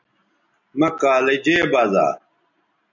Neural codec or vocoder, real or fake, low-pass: none; real; 7.2 kHz